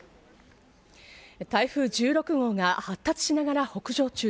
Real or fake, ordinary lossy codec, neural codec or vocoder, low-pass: real; none; none; none